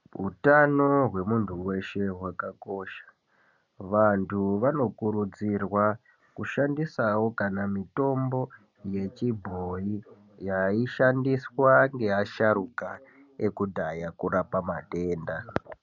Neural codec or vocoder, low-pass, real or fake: none; 7.2 kHz; real